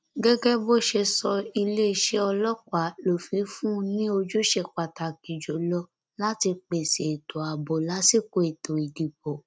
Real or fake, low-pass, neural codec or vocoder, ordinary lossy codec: real; none; none; none